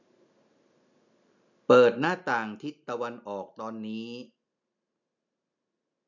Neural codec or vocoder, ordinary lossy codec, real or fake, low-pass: none; none; real; 7.2 kHz